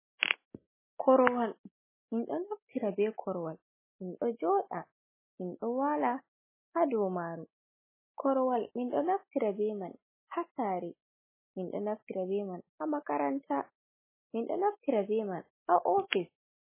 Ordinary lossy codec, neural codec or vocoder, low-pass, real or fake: MP3, 16 kbps; none; 3.6 kHz; real